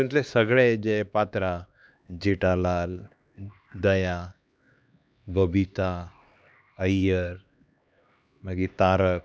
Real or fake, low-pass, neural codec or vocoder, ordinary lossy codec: fake; none; codec, 16 kHz, 2 kbps, X-Codec, HuBERT features, trained on LibriSpeech; none